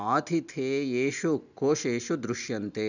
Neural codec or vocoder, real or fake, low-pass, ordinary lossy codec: none; real; 7.2 kHz; none